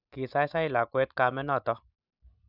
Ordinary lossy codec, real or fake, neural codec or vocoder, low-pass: none; real; none; 5.4 kHz